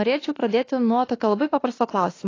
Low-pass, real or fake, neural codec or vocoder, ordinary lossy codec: 7.2 kHz; fake; autoencoder, 48 kHz, 32 numbers a frame, DAC-VAE, trained on Japanese speech; AAC, 32 kbps